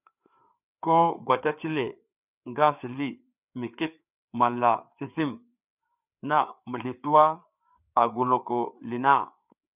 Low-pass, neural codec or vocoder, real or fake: 3.6 kHz; codec, 16 kHz, 4 kbps, FreqCodec, larger model; fake